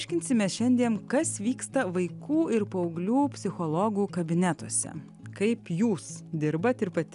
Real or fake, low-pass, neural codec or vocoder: real; 10.8 kHz; none